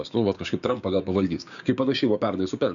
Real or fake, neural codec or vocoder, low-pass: fake; codec, 16 kHz, 6 kbps, DAC; 7.2 kHz